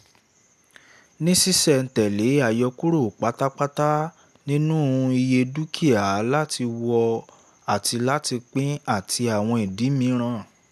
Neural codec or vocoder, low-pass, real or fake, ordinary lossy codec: none; 14.4 kHz; real; none